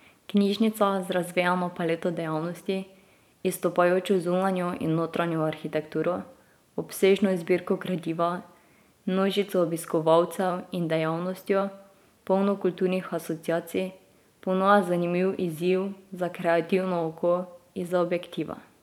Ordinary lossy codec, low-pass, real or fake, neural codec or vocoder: none; 19.8 kHz; fake; vocoder, 44.1 kHz, 128 mel bands every 512 samples, BigVGAN v2